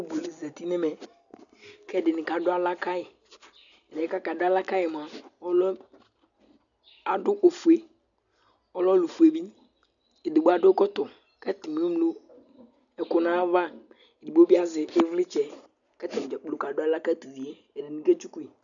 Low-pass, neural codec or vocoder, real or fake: 7.2 kHz; none; real